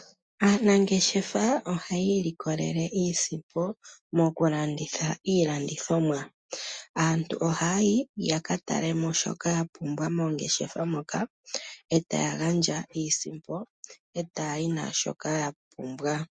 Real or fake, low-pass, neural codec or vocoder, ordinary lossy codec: real; 9.9 kHz; none; MP3, 48 kbps